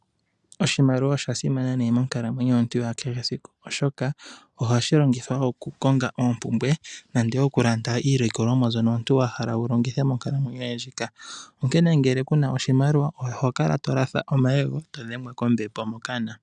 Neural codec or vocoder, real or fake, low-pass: none; real; 10.8 kHz